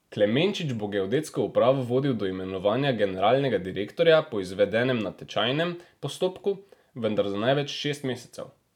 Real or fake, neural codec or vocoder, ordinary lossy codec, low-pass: real; none; none; 19.8 kHz